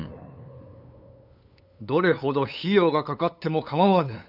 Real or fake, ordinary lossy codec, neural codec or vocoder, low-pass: fake; none; codec, 16 kHz, 8 kbps, FunCodec, trained on LibriTTS, 25 frames a second; 5.4 kHz